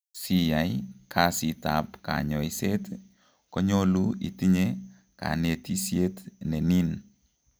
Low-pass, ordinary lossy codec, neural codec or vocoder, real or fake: none; none; none; real